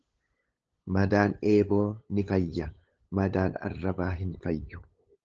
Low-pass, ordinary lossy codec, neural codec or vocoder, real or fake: 7.2 kHz; Opus, 16 kbps; codec, 16 kHz, 8 kbps, FunCodec, trained on LibriTTS, 25 frames a second; fake